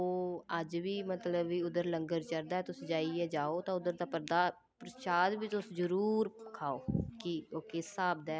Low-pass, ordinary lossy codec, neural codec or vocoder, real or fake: none; none; none; real